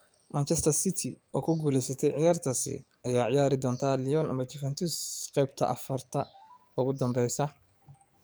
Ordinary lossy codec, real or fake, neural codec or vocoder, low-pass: none; fake; codec, 44.1 kHz, 7.8 kbps, DAC; none